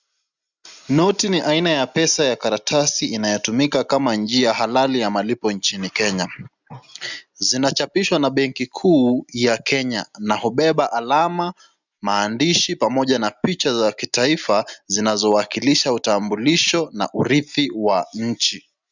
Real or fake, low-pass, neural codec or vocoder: real; 7.2 kHz; none